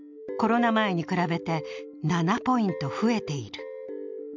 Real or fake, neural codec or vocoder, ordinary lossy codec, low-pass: real; none; none; 7.2 kHz